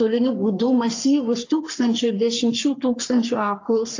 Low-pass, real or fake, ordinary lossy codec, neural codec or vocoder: 7.2 kHz; fake; AAC, 48 kbps; codec, 44.1 kHz, 3.4 kbps, Pupu-Codec